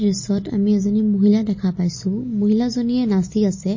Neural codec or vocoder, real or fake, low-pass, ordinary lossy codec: none; real; 7.2 kHz; MP3, 32 kbps